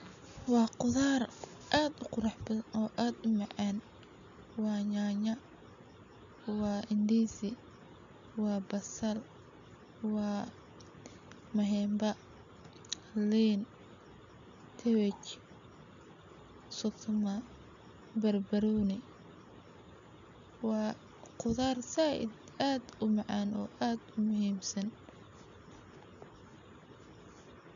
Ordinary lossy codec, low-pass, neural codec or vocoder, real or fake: none; 7.2 kHz; none; real